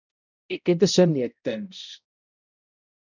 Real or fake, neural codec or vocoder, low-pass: fake; codec, 16 kHz, 0.5 kbps, X-Codec, HuBERT features, trained on balanced general audio; 7.2 kHz